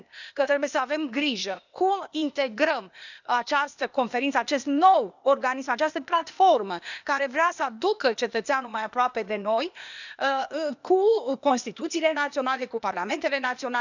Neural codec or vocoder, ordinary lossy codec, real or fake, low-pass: codec, 16 kHz, 0.8 kbps, ZipCodec; none; fake; 7.2 kHz